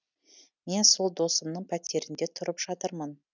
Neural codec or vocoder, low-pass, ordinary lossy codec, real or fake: none; 7.2 kHz; none; real